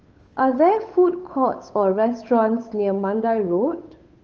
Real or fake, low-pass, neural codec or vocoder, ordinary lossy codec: fake; 7.2 kHz; codec, 16 kHz, 8 kbps, FunCodec, trained on Chinese and English, 25 frames a second; Opus, 24 kbps